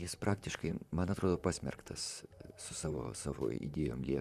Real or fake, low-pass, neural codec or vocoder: fake; 14.4 kHz; vocoder, 44.1 kHz, 128 mel bands, Pupu-Vocoder